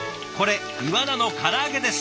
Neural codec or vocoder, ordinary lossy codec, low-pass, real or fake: none; none; none; real